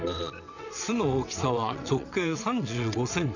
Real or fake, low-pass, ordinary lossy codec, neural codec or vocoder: fake; 7.2 kHz; none; vocoder, 22.05 kHz, 80 mel bands, WaveNeXt